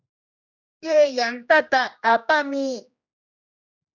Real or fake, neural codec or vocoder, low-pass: fake; codec, 16 kHz, 1 kbps, X-Codec, HuBERT features, trained on general audio; 7.2 kHz